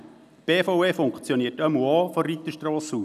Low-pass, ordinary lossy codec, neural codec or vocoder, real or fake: 14.4 kHz; none; none; real